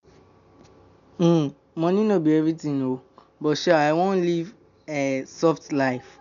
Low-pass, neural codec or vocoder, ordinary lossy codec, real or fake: 7.2 kHz; none; none; real